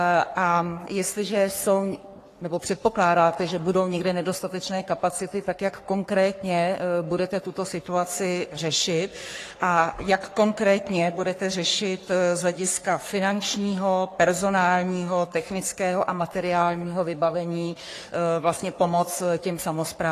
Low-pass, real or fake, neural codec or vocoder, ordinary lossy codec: 14.4 kHz; fake; codec, 44.1 kHz, 3.4 kbps, Pupu-Codec; AAC, 48 kbps